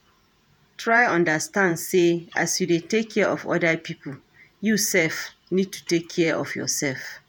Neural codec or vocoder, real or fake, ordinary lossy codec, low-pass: vocoder, 48 kHz, 128 mel bands, Vocos; fake; none; none